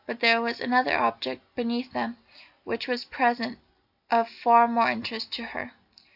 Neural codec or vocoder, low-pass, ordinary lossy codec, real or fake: none; 5.4 kHz; AAC, 48 kbps; real